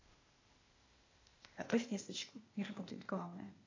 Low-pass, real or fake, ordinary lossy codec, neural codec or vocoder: 7.2 kHz; fake; AAC, 32 kbps; codec, 16 kHz, 0.8 kbps, ZipCodec